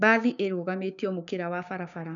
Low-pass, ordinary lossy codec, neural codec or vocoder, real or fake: 7.2 kHz; none; codec, 16 kHz, 6 kbps, DAC; fake